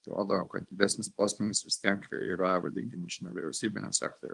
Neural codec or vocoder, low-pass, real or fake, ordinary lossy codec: codec, 24 kHz, 0.9 kbps, WavTokenizer, small release; 10.8 kHz; fake; Opus, 32 kbps